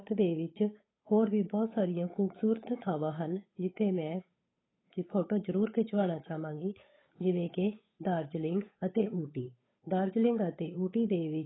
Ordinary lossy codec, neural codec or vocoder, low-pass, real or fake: AAC, 16 kbps; codec, 16 kHz, 8 kbps, FunCodec, trained on Chinese and English, 25 frames a second; 7.2 kHz; fake